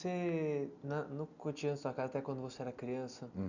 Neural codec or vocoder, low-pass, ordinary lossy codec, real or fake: none; 7.2 kHz; none; real